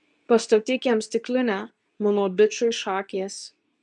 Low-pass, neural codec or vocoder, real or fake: 10.8 kHz; codec, 24 kHz, 0.9 kbps, WavTokenizer, medium speech release version 2; fake